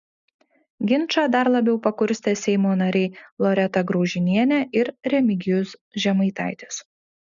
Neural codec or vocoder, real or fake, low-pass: none; real; 7.2 kHz